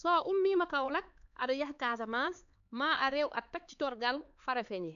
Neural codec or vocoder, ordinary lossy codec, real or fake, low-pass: codec, 16 kHz, 4 kbps, X-Codec, HuBERT features, trained on LibriSpeech; AAC, 96 kbps; fake; 7.2 kHz